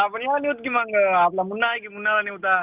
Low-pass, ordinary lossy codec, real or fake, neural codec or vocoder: 3.6 kHz; Opus, 32 kbps; real; none